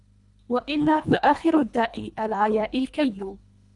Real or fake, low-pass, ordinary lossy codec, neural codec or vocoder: fake; 10.8 kHz; Opus, 64 kbps; codec, 24 kHz, 1.5 kbps, HILCodec